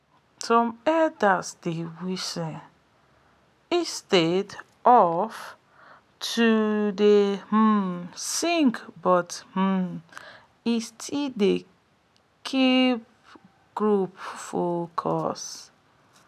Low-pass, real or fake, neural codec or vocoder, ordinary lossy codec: 14.4 kHz; real; none; none